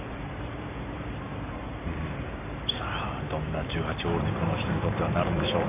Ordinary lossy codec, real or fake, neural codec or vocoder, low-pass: AAC, 24 kbps; fake; vocoder, 44.1 kHz, 128 mel bands every 512 samples, BigVGAN v2; 3.6 kHz